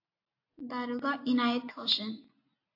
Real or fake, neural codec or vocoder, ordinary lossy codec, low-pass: real; none; AAC, 32 kbps; 5.4 kHz